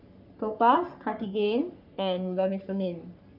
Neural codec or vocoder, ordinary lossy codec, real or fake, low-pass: codec, 44.1 kHz, 3.4 kbps, Pupu-Codec; none; fake; 5.4 kHz